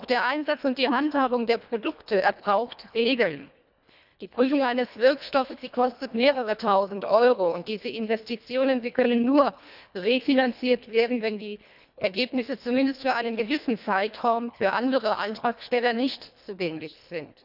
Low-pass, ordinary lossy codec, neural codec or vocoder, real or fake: 5.4 kHz; none; codec, 24 kHz, 1.5 kbps, HILCodec; fake